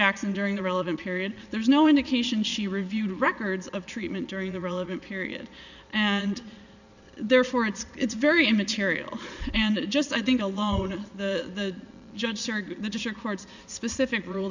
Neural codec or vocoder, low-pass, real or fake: vocoder, 22.05 kHz, 80 mel bands, Vocos; 7.2 kHz; fake